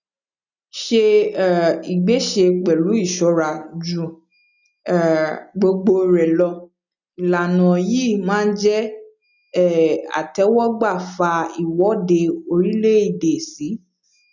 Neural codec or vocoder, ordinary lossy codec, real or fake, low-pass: none; none; real; 7.2 kHz